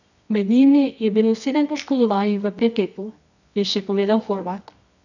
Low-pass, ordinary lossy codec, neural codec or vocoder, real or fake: 7.2 kHz; none; codec, 24 kHz, 0.9 kbps, WavTokenizer, medium music audio release; fake